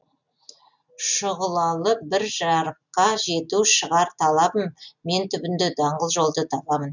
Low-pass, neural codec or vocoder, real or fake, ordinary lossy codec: 7.2 kHz; none; real; none